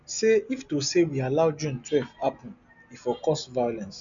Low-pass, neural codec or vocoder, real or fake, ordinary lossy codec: 7.2 kHz; none; real; none